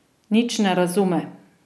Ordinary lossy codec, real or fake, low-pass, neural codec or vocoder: none; real; none; none